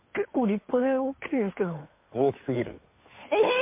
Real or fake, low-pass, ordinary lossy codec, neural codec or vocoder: fake; 3.6 kHz; MP3, 24 kbps; codec, 16 kHz, 2 kbps, FunCodec, trained on Chinese and English, 25 frames a second